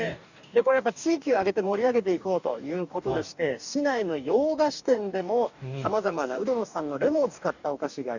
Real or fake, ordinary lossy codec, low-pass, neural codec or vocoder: fake; none; 7.2 kHz; codec, 44.1 kHz, 2.6 kbps, DAC